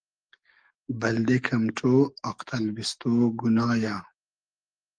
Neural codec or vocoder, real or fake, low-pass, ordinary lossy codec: codec, 44.1 kHz, 7.8 kbps, DAC; fake; 9.9 kHz; Opus, 24 kbps